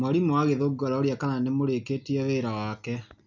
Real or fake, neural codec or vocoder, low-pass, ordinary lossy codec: real; none; 7.2 kHz; none